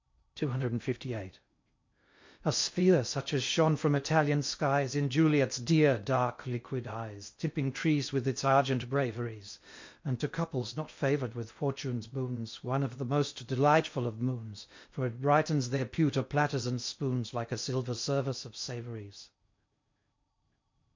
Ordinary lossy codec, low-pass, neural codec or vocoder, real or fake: MP3, 48 kbps; 7.2 kHz; codec, 16 kHz in and 24 kHz out, 0.6 kbps, FocalCodec, streaming, 4096 codes; fake